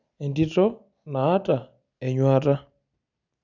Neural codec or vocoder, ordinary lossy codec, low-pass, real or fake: none; none; 7.2 kHz; real